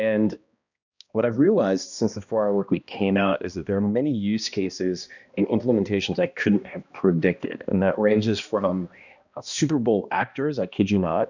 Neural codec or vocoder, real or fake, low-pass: codec, 16 kHz, 1 kbps, X-Codec, HuBERT features, trained on balanced general audio; fake; 7.2 kHz